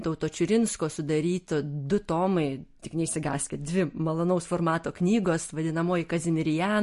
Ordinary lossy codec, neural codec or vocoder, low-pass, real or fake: MP3, 48 kbps; none; 14.4 kHz; real